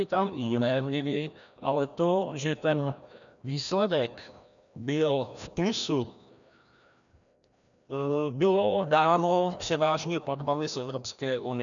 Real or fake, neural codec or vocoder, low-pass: fake; codec, 16 kHz, 1 kbps, FreqCodec, larger model; 7.2 kHz